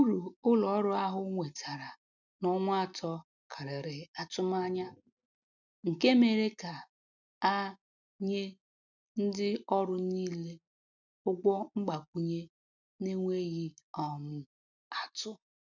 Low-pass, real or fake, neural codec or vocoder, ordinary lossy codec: 7.2 kHz; real; none; none